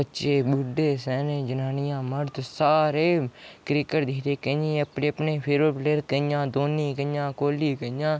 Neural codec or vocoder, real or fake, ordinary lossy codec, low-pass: none; real; none; none